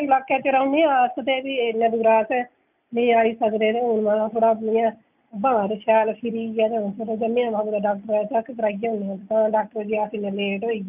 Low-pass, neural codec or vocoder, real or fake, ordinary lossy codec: 3.6 kHz; none; real; none